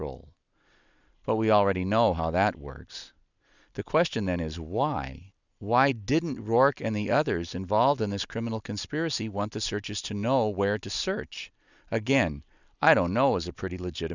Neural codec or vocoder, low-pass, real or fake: none; 7.2 kHz; real